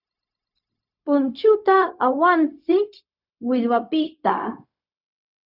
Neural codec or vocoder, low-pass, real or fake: codec, 16 kHz, 0.4 kbps, LongCat-Audio-Codec; 5.4 kHz; fake